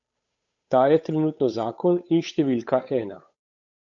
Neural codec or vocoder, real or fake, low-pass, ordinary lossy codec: codec, 16 kHz, 8 kbps, FunCodec, trained on Chinese and English, 25 frames a second; fake; 7.2 kHz; AAC, 64 kbps